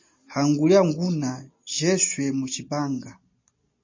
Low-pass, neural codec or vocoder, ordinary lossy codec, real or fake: 7.2 kHz; none; MP3, 32 kbps; real